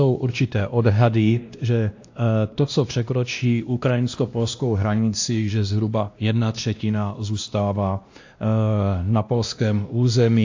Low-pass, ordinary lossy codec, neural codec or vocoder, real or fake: 7.2 kHz; AAC, 48 kbps; codec, 16 kHz, 1 kbps, X-Codec, WavLM features, trained on Multilingual LibriSpeech; fake